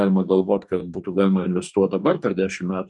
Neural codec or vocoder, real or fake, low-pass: codec, 44.1 kHz, 2.6 kbps, DAC; fake; 10.8 kHz